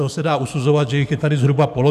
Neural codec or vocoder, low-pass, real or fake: codec, 44.1 kHz, 7.8 kbps, DAC; 14.4 kHz; fake